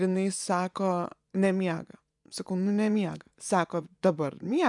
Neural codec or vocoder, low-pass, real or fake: vocoder, 24 kHz, 100 mel bands, Vocos; 10.8 kHz; fake